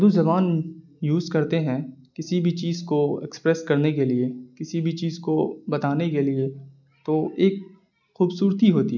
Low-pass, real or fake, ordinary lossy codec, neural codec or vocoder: 7.2 kHz; real; none; none